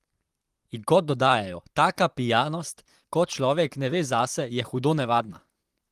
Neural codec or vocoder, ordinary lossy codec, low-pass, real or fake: vocoder, 44.1 kHz, 128 mel bands, Pupu-Vocoder; Opus, 24 kbps; 14.4 kHz; fake